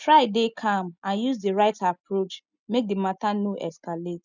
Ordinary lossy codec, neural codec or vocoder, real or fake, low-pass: none; none; real; 7.2 kHz